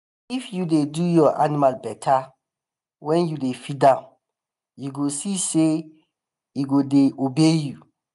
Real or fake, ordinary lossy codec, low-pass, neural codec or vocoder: real; none; 10.8 kHz; none